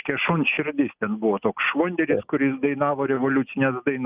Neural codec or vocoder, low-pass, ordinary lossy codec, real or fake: none; 3.6 kHz; Opus, 24 kbps; real